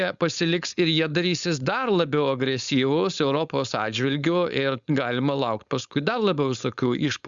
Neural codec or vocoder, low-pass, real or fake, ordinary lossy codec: codec, 16 kHz, 4.8 kbps, FACodec; 7.2 kHz; fake; Opus, 64 kbps